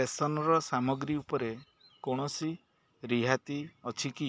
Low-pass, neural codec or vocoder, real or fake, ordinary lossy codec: none; none; real; none